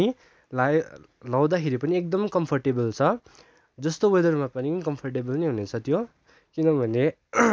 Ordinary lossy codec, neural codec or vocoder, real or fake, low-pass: none; none; real; none